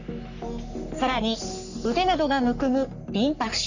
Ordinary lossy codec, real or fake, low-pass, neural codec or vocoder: none; fake; 7.2 kHz; codec, 44.1 kHz, 3.4 kbps, Pupu-Codec